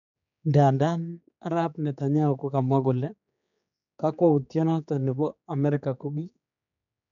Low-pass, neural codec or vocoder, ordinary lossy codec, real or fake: 7.2 kHz; codec, 16 kHz, 4 kbps, X-Codec, HuBERT features, trained on general audio; MP3, 64 kbps; fake